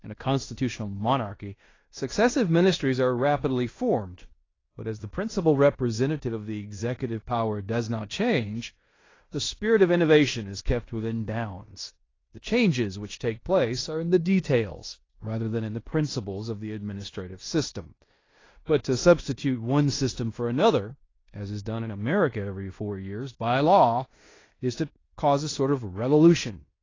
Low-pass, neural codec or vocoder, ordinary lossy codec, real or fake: 7.2 kHz; codec, 16 kHz in and 24 kHz out, 0.9 kbps, LongCat-Audio-Codec, fine tuned four codebook decoder; AAC, 32 kbps; fake